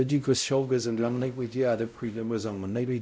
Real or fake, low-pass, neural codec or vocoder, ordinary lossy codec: fake; none; codec, 16 kHz, 0.5 kbps, X-Codec, WavLM features, trained on Multilingual LibriSpeech; none